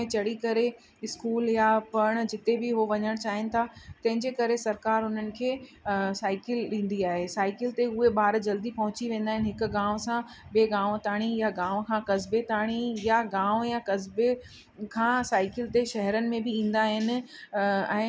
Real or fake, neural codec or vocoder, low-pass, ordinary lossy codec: real; none; none; none